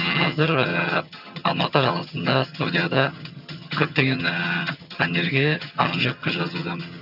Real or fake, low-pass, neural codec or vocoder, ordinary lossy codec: fake; 5.4 kHz; vocoder, 22.05 kHz, 80 mel bands, HiFi-GAN; none